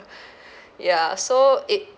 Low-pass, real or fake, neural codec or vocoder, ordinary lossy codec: none; real; none; none